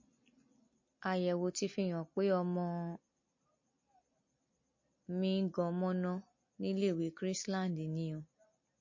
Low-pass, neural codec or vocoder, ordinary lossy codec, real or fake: 7.2 kHz; none; MP3, 32 kbps; real